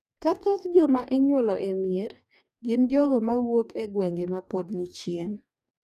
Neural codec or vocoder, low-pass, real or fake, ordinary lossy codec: codec, 44.1 kHz, 2.6 kbps, DAC; 14.4 kHz; fake; none